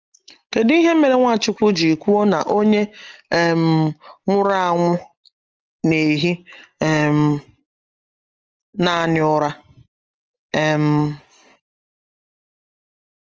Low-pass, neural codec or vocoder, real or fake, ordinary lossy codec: 7.2 kHz; none; real; Opus, 24 kbps